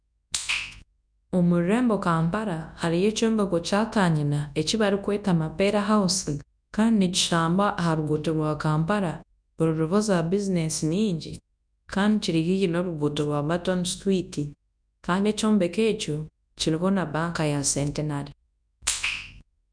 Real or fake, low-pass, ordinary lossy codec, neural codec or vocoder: fake; 9.9 kHz; none; codec, 24 kHz, 0.9 kbps, WavTokenizer, large speech release